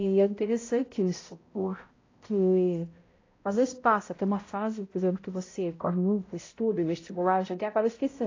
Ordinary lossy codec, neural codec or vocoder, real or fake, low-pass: AAC, 32 kbps; codec, 16 kHz, 0.5 kbps, X-Codec, HuBERT features, trained on balanced general audio; fake; 7.2 kHz